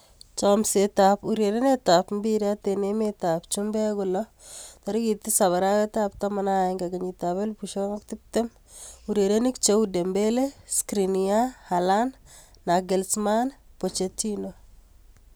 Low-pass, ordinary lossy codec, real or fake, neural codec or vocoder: none; none; real; none